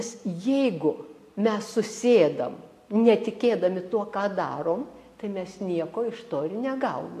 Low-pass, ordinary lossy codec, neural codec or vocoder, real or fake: 14.4 kHz; AAC, 64 kbps; none; real